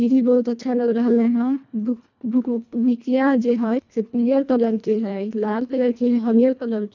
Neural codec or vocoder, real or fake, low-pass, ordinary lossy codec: codec, 24 kHz, 1.5 kbps, HILCodec; fake; 7.2 kHz; none